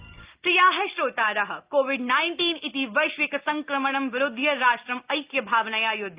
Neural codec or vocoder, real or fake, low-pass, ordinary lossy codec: none; real; 3.6 kHz; Opus, 32 kbps